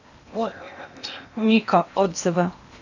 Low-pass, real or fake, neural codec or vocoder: 7.2 kHz; fake; codec, 16 kHz in and 24 kHz out, 0.8 kbps, FocalCodec, streaming, 65536 codes